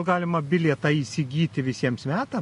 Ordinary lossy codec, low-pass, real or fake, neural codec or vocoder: MP3, 48 kbps; 10.8 kHz; real; none